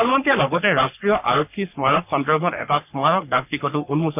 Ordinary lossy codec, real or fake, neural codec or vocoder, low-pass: none; fake; codec, 44.1 kHz, 3.4 kbps, Pupu-Codec; 3.6 kHz